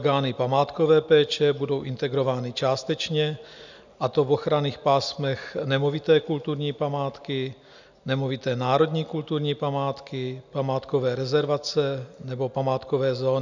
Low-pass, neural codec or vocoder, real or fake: 7.2 kHz; none; real